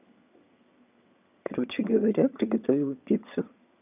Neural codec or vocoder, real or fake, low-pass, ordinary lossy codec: vocoder, 22.05 kHz, 80 mel bands, HiFi-GAN; fake; 3.6 kHz; none